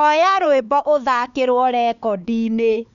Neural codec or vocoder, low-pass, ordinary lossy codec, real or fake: codec, 16 kHz, 4 kbps, X-Codec, HuBERT features, trained on LibriSpeech; 7.2 kHz; none; fake